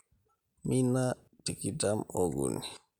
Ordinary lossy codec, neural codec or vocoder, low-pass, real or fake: none; none; 19.8 kHz; real